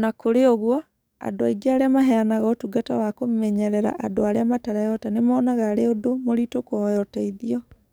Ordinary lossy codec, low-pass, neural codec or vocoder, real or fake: none; none; codec, 44.1 kHz, 7.8 kbps, DAC; fake